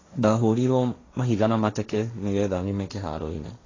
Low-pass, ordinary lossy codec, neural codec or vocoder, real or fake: 7.2 kHz; AAC, 32 kbps; codec, 16 kHz, 1.1 kbps, Voila-Tokenizer; fake